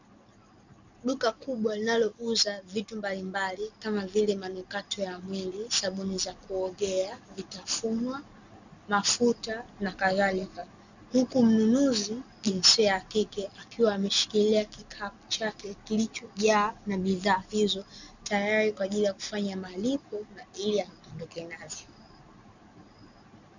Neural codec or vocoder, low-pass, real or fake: none; 7.2 kHz; real